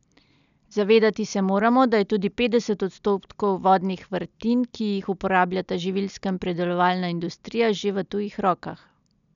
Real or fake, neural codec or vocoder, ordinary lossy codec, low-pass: real; none; none; 7.2 kHz